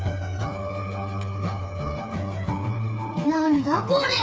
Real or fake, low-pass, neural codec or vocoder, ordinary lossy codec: fake; none; codec, 16 kHz, 4 kbps, FreqCodec, smaller model; none